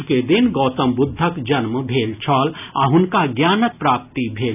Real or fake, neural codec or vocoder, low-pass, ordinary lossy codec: real; none; 3.6 kHz; none